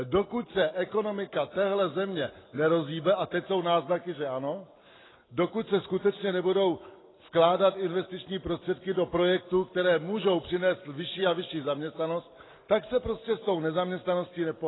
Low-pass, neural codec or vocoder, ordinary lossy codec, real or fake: 7.2 kHz; none; AAC, 16 kbps; real